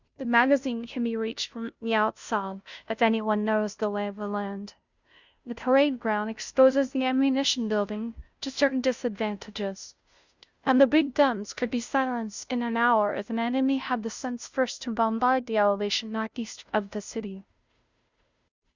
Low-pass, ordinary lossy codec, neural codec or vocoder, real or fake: 7.2 kHz; Opus, 64 kbps; codec, 16 kHz, 0.5 kbps, FunCodec, trained on Chinese and English, 25 frames a second; fake